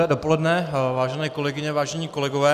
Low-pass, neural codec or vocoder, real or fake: 14.4 kHz; none; real